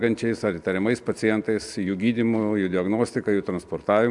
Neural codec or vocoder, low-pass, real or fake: none; 10.8 kHz; real